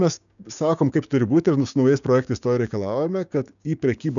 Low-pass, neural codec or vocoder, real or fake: 7.2 kHz; codec, 16 kHz, 6 kbps, DAC; fake